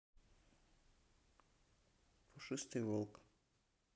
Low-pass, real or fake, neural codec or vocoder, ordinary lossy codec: none; real; none; none